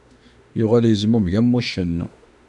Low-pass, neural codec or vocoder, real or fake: 10.8 kHz; autoencoder, 48 kHz, 32 numbers a frame, DAC-VAE, trained on Japanese speech; fake